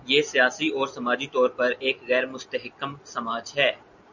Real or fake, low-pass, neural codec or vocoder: real; 7.2 kHz; none